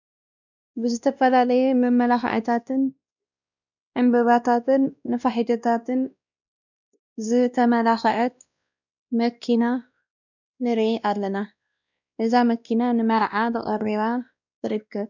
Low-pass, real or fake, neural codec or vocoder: 7.2 kHz; fake; codec, 16 kHz, 1 kbps, X-Codec, WavLM features, trained on Multilingual LibriSpeech